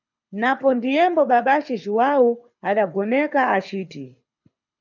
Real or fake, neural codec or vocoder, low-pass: fake; codec, 24 kHz, 6 kbps, HILCodec; 7.2 kHz